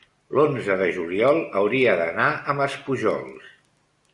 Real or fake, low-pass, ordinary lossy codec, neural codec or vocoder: real; 10.8 kHz; Opus, 64 kbps; none